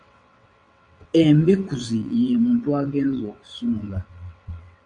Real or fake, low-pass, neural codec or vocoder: fake; 9.9 kHz; vocoder, 22.05 kHz, 80 mel bands, WaveNeXt